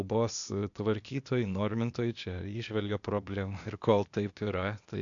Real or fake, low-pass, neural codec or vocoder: fake; 7.2 kHz; codec, 16 kHz, 0.8 kbps, ZipCodec